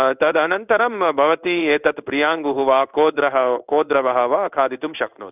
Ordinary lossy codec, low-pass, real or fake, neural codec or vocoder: none; 3.6 kHz; fake; codec, 16 kHz in and 24 kHz out, 1 kbps, XY-Tokenizer